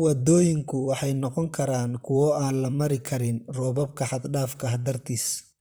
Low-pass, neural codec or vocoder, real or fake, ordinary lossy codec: none; vocoder, 44.1 kHz, 128 mel bands, Pupu-Vocoder; fake; none